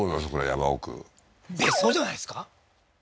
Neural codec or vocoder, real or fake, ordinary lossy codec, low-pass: none; real; none; none